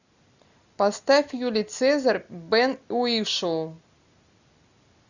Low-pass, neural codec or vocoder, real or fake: 7.2 kHz; none; real